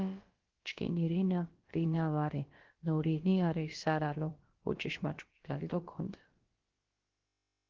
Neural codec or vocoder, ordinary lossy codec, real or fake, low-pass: codec, 16 kHz, about 1 kbps, DyCAST, with the encoder's durations; Opus, 16 kbps; fake; 7.2 kHz